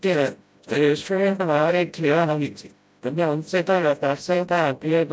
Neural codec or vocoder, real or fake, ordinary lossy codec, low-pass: codec, 16 kHz, 0.5 kbps, FreqCodec, smaller model; fake; none; none